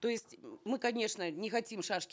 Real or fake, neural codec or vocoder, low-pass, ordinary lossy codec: real; none; none; none